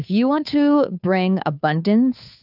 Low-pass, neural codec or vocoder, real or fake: 5.4 kHz; codec, 16 kHz, 2 kbps, FunCodec, trained on Chinese and English, 25 frames a second; fake